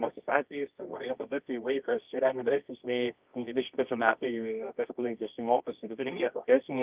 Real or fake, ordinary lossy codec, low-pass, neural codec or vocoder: fake; Opus, 24 kbps; 3.6 kHz; codec, 24 kHz, 0.9 kbps, WavTokenizer, medium music audio release